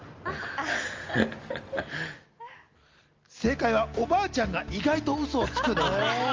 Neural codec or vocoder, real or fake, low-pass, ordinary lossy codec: none; real; 7.2 kHz; Opus, 32 kbps